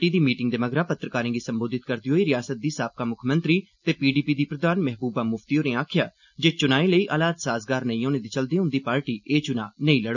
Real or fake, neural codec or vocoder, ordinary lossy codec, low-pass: real; none; none; 7.2 kHz